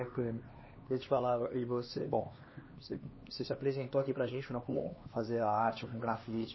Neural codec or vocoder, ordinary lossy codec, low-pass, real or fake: codec, 16 kHz, 2 kbps, X-Codec, HuBERT features, trained on LibriSpeech; MP3, 24 kbps; 7.2 kHz; fake